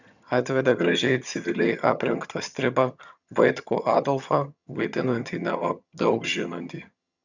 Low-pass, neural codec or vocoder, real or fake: 7.2 kHz; vocoder, 22.05 kHz, 80 mel bands, HiFi-GAN; fake